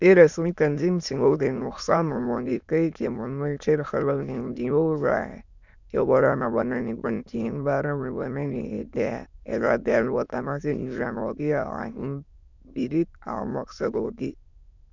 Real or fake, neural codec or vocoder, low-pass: fake; autoencoder, 22.05 kHz, a latent of 192 numbers a frame, VITS, trained on many speakers; 7.2 kHz